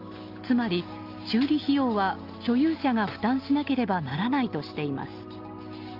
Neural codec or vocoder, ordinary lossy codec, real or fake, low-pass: none; Opus, 32 kbps; real; 5.4 kHz